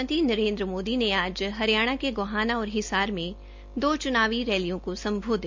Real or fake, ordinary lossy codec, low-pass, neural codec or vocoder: real; MP3, 64 kbps; 7.2 kHz; none